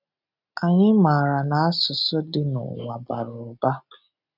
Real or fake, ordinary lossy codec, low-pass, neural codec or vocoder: real; none; 5.4 kHz; none